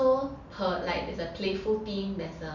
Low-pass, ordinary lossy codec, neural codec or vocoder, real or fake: 7.2 kHz; none; none; real